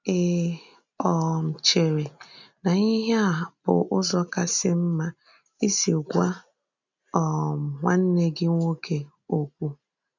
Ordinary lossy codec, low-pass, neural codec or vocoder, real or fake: none; 7.2 kHz; none; real